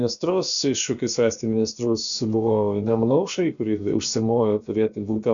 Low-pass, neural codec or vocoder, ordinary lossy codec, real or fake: 7.2 kHz; codec, 16 kHz, about 1 kbps, DyCAST, with the encoder's durations; MP3, 96 kbps; fake